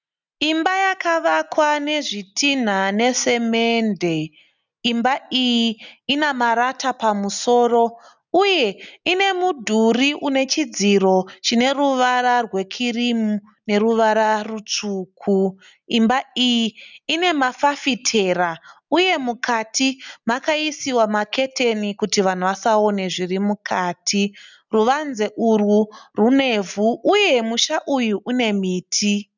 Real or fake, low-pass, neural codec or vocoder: real; 7.2 kHz; none